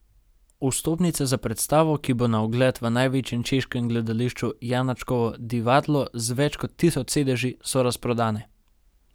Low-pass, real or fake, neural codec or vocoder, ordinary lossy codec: none; real; none; none